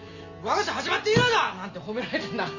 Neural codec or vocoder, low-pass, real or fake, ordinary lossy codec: none; 7.2 kHz; real; none